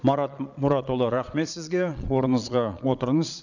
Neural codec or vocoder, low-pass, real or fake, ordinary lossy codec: vocoder, 22.05 kHz, 80 mel bands, Vocos; 7.2 kHz; fake; none